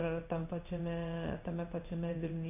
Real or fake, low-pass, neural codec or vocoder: fake; 3.6 kHz; codec, 16 kHz in and 24 kHz out, 1 kbps, XY-Tokenizer